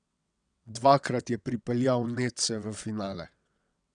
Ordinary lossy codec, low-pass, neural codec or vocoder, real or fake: none; 9.9 kHz; vocoder, 22.05 kHz, 80 mel bands, WaveNeXt; fake